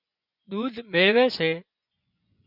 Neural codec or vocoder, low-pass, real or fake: vocoder, 24 kHz, 100 mel bands, Vocos; 5.4 kHz; fake